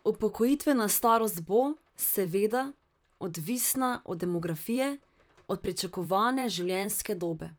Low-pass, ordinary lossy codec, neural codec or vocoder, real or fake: none; none; vocoder, 44.1 kHz, 128 mel bands, Pupu-Vocoder; fake